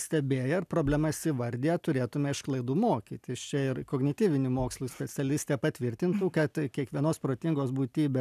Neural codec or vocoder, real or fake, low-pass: none; real; 14.4 kHz